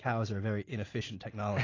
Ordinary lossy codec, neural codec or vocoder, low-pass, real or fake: AAC, 32 kbps; none; 7.2 kHz; real